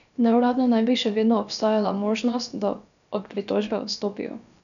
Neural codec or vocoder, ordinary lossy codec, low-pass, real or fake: codec, 16 kHz, about 1 kbps, DyCAST, with the encoder's durations; none; 7.2 kHz; fake